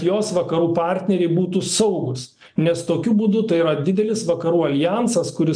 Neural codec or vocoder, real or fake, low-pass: none; real; 9.9 kHz